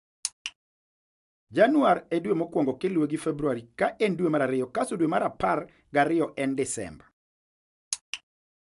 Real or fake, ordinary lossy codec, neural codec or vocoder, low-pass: real; AAC, 96 kbps; none; 10.8 kHz